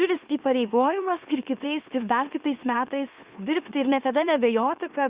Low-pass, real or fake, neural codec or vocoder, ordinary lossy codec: 3.6 kHz; fake; autoencoder, 44.1 kHz, a latent of 192 numbers a frame, MeloTTS; Opus, 64 kbps